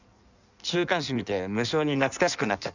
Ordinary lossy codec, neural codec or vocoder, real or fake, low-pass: none; codec, 16 kHz in and 24 kHz out, 1.1 kbps, FireRedTTS-2 codec; fake; 7.2 kHz